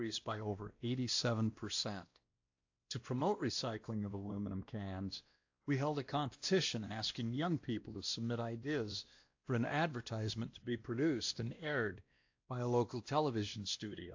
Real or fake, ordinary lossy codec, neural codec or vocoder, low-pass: fake; AAC, 48 kbps; codec, 16 kHz, 1 kbps, X-Codec, WavLM features, trained on Multilingual LibriSpeech; 7.2 kHz